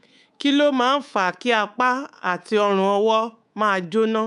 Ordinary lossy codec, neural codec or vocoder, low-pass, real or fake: none; codec, 24 kHz, 3.1 kbps, DualCodec; 10.8 kHz; fake